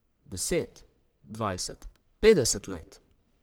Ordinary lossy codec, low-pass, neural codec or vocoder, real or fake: none; none; codec, 44.1 kHz, 1.7 kbps, Pupu-Codec; fake